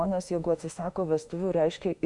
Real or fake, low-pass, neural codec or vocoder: fake; 10.8 kHz; autoencoder, 48 kHz, 32 numbers a frame, DAC-VAE, trained on Japanese speech